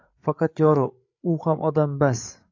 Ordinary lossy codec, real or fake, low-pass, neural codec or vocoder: AAC, 48 kbps; fake; 7.2 kHz; codec, 16 kHz, 8 kbps, FreqCodec, larger model